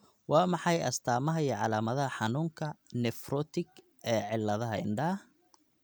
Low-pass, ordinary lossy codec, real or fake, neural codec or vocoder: none; none; real; none